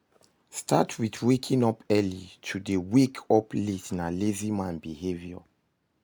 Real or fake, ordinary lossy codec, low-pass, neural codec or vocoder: real; none; none; none